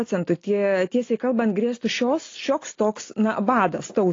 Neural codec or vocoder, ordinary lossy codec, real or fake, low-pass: none; AAC, 32 kbps; real; 7.2 kHz